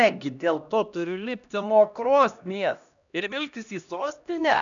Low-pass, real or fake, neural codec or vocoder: 7.2 kHz; fake; codec, 16 kHz, 1 kbps, X-Codec, HuBERT features, trained on LibriSpeech